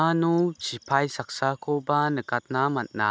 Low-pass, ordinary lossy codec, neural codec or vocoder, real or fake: none; none; none; real